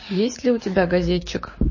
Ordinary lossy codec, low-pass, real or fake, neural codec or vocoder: MP3, 32 kbps; 7.2 kHz; fake; codec, 16 kHz, 6 kbps, DAC